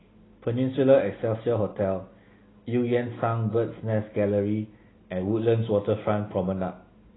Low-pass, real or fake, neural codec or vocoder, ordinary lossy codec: 7.2 kHz; real; none; AAC, 16 kbps